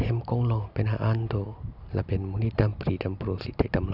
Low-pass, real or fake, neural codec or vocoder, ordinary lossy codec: 5.4 kHz; real; none; none